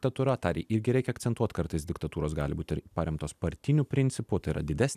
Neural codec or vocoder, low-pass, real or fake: none; 14.4 kHz; real